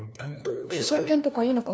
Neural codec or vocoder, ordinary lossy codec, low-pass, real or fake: codec, 16 kHz, 1 kbps, FunCodec, trained on LibriTTS, 50 frames a second; none; none; fake